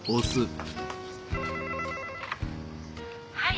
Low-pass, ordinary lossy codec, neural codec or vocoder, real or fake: none; none; none; real